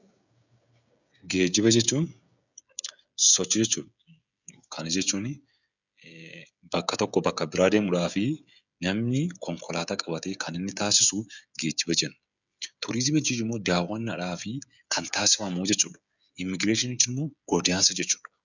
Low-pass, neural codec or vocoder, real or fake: 7.2 kHz; codec, 16 kHz, 16 kbps, FreqCodec, smaller model; fake